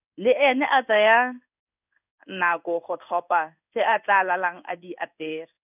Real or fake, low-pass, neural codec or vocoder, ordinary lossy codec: fake; 3.6 kHz; codec, 16 kHz in and 24 kHz out, 1 kbps, XY-Tokenizer; none